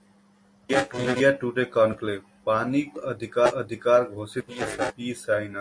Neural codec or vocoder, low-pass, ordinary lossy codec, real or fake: none; 9.9 kHz; MP3, 48 kbps; real